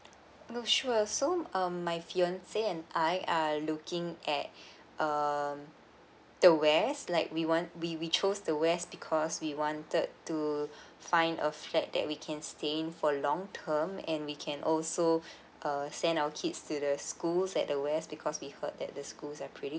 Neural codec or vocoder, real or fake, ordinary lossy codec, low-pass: none; real; none; none